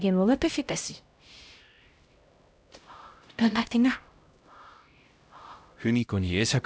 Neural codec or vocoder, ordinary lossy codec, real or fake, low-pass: codec, 16 kHz, 0.5 kbps, X-Codec, HuBERT features, trained on LibriSpeech; none; fake; none